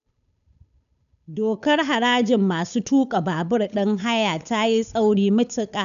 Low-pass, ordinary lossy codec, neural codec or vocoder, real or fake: 7.2 kHz; none; codec, 16 kHz, 8 kbps, FunCodec, trained on Chinese and English, 25 frames a second; fake